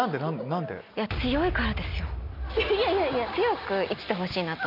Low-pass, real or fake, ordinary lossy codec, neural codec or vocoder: 5.4 kHz; real; MP3, 48 kbps; none